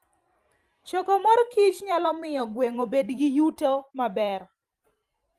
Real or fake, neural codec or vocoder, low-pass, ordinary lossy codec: fake; vocoder, 44.1 kHz, 128 mel bands every 512 samples, BigVGAN v2; 14.4 kHz; Opus, 32 kbps